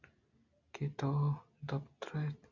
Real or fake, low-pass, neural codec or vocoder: real; 7.2 kHz; none